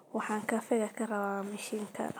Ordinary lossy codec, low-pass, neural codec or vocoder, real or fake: none; none; none; real